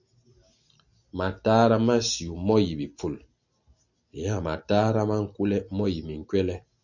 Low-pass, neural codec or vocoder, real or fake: 7.2 kHz; none; real